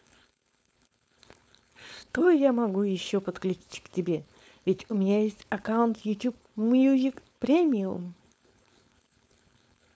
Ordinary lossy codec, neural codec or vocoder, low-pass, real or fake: none; codec, 16 kHz, 4.8 kbps, FACodec; none; fake